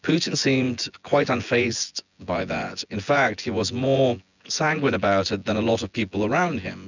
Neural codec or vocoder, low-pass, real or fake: vocoder, 24 kHz, 100 mel bands, Vocos; 7.2 kHz; fake